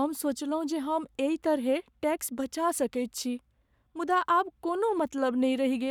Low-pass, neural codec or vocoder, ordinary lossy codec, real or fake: 19.8 kHz; codec, 44.1 kHz, 7.8 kbps, Pupu-Codec; none; fake